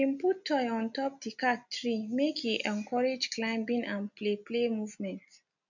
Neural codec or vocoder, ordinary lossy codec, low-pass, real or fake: none; none; 7.2 kHz; real